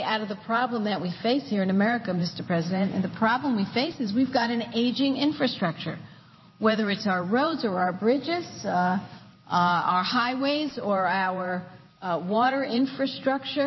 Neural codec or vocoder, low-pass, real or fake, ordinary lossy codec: vocoder, 44.1 kHz, 128 mel bands every 512 samples, BigVGAN v2; 7.2 kHz; fake; MP3, 24 kbps